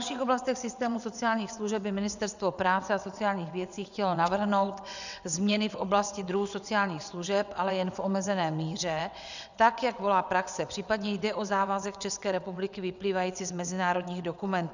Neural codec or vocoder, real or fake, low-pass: vocoder, 22.05 kHz, 80 mel bands, WaveNeXt; fake; 7.2 kHz